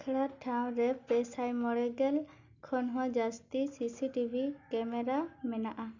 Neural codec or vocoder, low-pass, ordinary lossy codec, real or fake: none; 7.2 kHz; Opus, 64 kbps; real